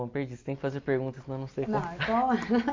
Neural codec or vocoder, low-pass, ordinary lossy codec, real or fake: none; 7.2 kHz; MP3, 64 kbps; real